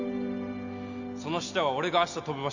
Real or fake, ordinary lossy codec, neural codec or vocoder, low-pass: real; none; none; 7.2 kHz